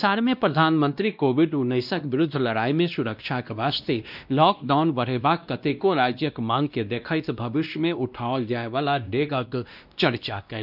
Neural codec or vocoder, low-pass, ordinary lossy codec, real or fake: codec, 16 kHz, 1 kbps, X-Codec, WavLM features, trained on Multilingual LibriSpeech; 5.4 kHz; none; fake